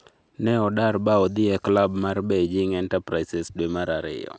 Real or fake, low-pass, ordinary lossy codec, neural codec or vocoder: real; none; none; none